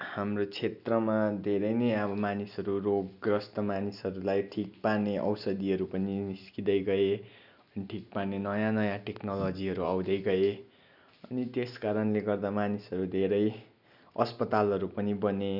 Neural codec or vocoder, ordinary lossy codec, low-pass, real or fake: none; none; 5.4 kHz; real